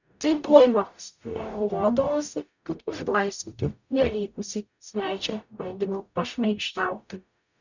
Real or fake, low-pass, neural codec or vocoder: fake; 7.2 kHz; codec, 44.1 kHz, 0.9 kbps, DAC